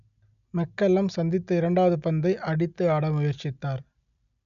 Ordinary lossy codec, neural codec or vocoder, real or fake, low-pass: none; none; real; 7.2 kHz